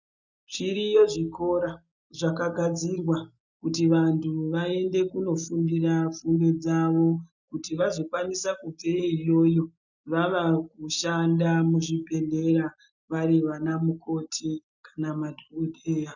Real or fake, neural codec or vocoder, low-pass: real; none; 7.2 kHz